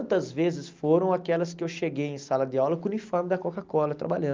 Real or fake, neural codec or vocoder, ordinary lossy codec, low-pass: real; none; Opus, 32 kbps; 7.2 kHz